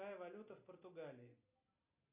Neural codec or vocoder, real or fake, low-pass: none; real; 3.6 kHz